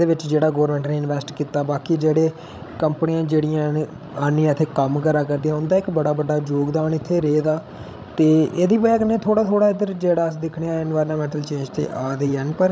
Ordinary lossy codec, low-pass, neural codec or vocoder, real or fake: none; none; codec, 16 kHz, 16 kbps, FreqCodec, larger model; fake